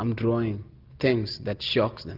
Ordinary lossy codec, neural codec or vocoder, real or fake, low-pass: Opus, 24 kbps; none; real; 5.4 kHz